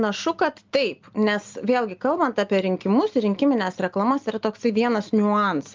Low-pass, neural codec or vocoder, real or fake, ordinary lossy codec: 7.2 kHz; none; real; Opus, 24 kbps